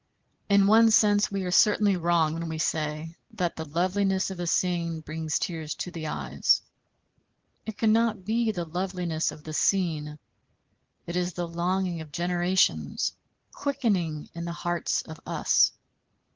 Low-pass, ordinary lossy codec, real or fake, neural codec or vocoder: 7.2 kHz; Opus, 16 kbps; real; none